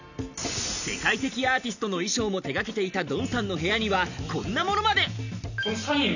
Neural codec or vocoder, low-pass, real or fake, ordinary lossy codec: none; 7.2 kHz; real; none